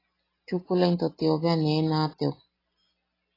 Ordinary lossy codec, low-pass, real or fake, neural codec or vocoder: AAC, 24 kbps; 5.4 kHz; real; none